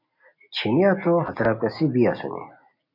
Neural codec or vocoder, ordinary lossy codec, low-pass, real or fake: none; MP3, 32 kbps; 5.4 kHz; real